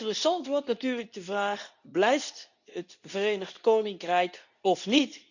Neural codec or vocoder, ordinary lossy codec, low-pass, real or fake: codec, 24 kHz, 0.9 kbps, WavTokenizer, medium speech release version 2; none; 7.2 kHz; fake